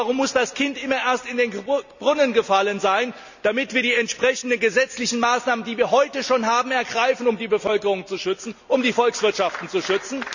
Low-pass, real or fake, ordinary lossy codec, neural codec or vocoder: 7.2 kHz; real; none; none